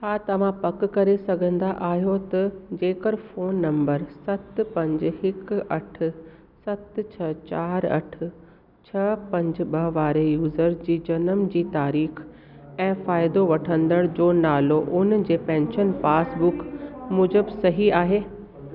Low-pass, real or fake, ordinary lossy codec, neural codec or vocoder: 5.4 kHz; real; none; none